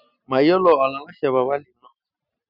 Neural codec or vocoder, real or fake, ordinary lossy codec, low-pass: none; real; none; 5.4 kHz